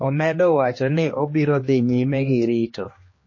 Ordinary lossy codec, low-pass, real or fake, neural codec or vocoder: MP3, 32 kbps; 7.2 kHz; fake; codec, 16 kHz, 2 kbps, X-Codec, HuBERT features, trained on general audio